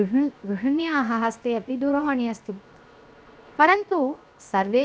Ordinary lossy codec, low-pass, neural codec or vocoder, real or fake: none; none; codec, 16 kHz, 0.7 kbps, FocalCodec; fake